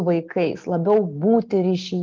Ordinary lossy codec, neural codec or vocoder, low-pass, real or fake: Opus, 24 kbps; none; 7.2 kHz; real